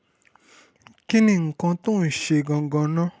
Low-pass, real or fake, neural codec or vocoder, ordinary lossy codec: none; real; none; none